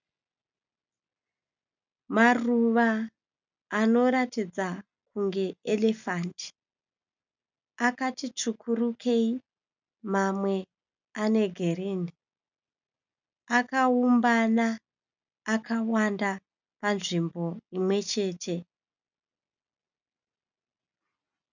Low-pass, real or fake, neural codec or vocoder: 7.2 kHz; real; none